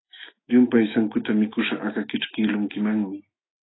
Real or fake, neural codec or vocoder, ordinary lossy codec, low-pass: fake; autoencoder, 48 kHz, 128 numbers a frame, DAC-VAE, trained on Japanese speech; AAC, 16 kbps; 7.2 kHz